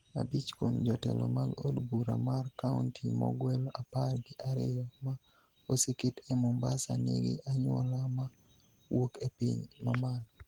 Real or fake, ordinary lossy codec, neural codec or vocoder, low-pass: real; Opus, 16 kbps; none; 19.8 kHz